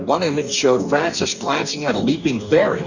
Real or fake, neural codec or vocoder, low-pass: fake; codec, 44.1 kHz, 2.6 kbps, DAC; 7.2 kHz